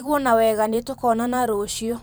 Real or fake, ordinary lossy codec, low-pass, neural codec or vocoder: fake; none; none; vocoder, 44.1 kHz, 128 mel bands every 512 samples, BigVGAN v2